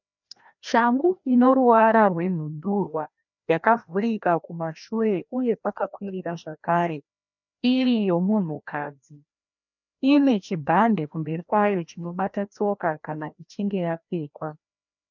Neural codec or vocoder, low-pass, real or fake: codec, 16 kHz, 1 kbps, FreqCodec, larger model; 7.2 kHz; fake